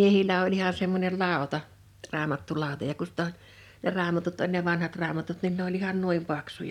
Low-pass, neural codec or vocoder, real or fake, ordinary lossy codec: 19.8 kHz; vocoder, 44.1 kHz, 128 mel bands every 256 samples, BigVGAN v2; fake; none